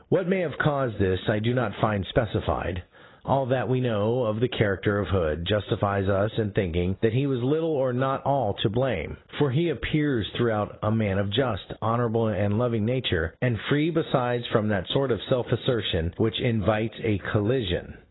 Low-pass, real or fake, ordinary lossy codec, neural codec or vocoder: 7.2 kHz; real; AAC, 16 kbps; none